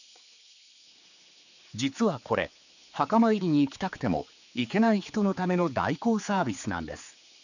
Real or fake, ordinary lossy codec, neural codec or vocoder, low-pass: fake; none; codec, 16 kHz, 4 kbps, X-Codec, HuBERT features, trained on general audio; 7.2 kHz